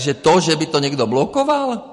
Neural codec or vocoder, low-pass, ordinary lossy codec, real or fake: vocoder, 44.1 kHz, 128 mel bands every 256 samples, BigVGAN v2; 14.4 kHz; MP3, 48 kbps; fake